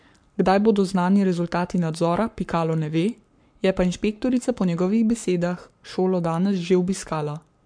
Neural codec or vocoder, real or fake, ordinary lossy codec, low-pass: codec, 44.1 kHz, 7.8 kbps, Pupu-Codec; fake; MP3, 64 kbps; 9.9 kHz